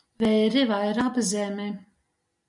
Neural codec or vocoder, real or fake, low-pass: none; real; 10.8 kHz